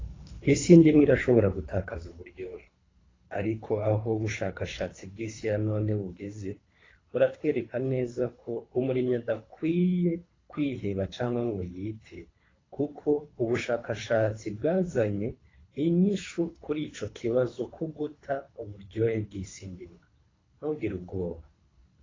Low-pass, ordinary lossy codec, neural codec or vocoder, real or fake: 7.2 kHz; AAC, 32 kbps; codec, 24 kHz, 3 kbps, HILCodec; fake